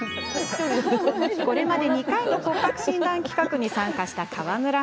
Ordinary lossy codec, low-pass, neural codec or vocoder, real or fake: none; none; none; real